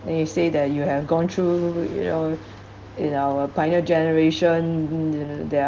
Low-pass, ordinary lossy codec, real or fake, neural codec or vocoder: 7.2 kHz; Opus, 16 kbps; real; none